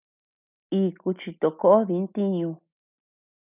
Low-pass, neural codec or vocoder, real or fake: 3.6 kHz; none; real